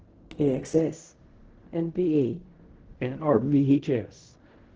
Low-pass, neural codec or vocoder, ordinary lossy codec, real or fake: 7.2 kHz; codec, 16 kHz in and 24 kHz out, 0.4 kbps, LongCat-Audio-Codec, fine tuned four codebook decoder; Opus, 16 kbps; fake